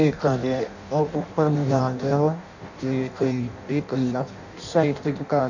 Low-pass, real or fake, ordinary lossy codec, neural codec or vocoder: 7.2 kHz; fake; none; codec, 16 kHz in and 24 kHz out, 0.6 kbps, FireRedTTS-2 codec